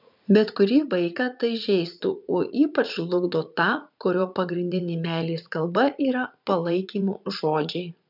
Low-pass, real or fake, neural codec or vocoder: 5.4 kHz; fake; vocoder, 44.1 kHz, 80 mel bands, Vocos